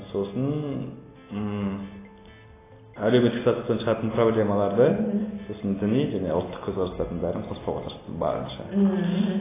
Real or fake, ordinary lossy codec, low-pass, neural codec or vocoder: real; AAC, 16 kbps; 3.6 kHz; none